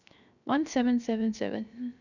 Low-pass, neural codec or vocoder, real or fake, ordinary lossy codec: 7.2 kHz; codec, 16 kHz, 0.7 kbps, FocalCodec; fake; none